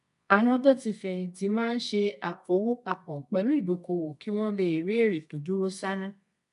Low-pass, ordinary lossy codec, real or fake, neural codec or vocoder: 10.8 kHz; MP3, 96 kbps; fake; codec, 24 kHz, 0.9 kbps, WavTokenizer, medium music audio release